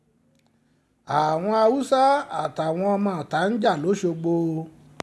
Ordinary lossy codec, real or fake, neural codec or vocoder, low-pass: none; real; none; none